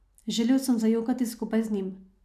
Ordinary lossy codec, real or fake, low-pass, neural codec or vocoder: none; real; 14.4 kHz; none